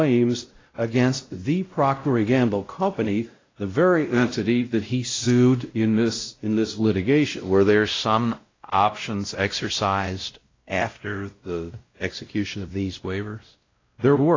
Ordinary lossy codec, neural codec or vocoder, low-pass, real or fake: AAC, 32 kbps; codec, 16 kHz, 0.5 kbps, X-Codec, WavLM features, trained on Multilingual LibriSpeech; 7.2 kHz; fake